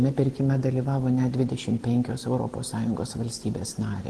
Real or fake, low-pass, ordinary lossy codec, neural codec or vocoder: real; 10.8 kHz; Opus, 16 kbps; none